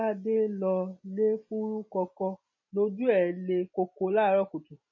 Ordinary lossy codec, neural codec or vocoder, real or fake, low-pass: MP3, 32 kbps; none; real; 7.2 kHz